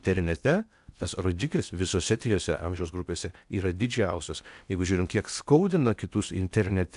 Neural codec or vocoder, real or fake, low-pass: codec, 16 kHz in and 24 kHz out, 0.8 kbps, FocalCodec, streaming, 65536 codes; fake; 10.8 kHz